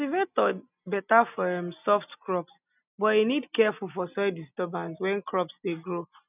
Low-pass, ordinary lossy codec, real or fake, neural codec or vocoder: 3.6 kHz; none; real; none